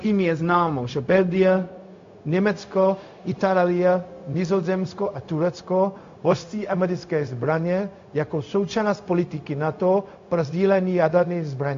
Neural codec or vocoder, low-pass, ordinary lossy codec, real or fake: codec, 16 kHz, 0.4 kbps, LongCat-Audio-Codec; 7.2 kHz; AAC, 48 kbps; fake